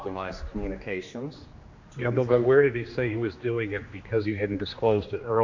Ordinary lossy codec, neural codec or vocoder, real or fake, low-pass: AAC, 48 kbps; codec, 16 kHz, 2 kbps, X-Codec, HuBERT features, trained on general audio; fake; 7.2 kHz